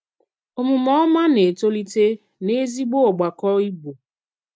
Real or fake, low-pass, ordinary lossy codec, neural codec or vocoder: real; none; none; none